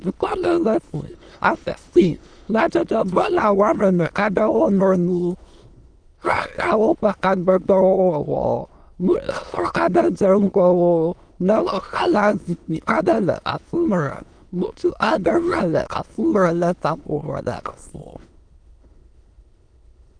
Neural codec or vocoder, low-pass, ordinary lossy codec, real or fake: autoencoder, 22.05 kHz, a latent of 192 numbers a frame, VITS, trained on many speakers; 9.9 kHz; Opus, 32 kbps; fake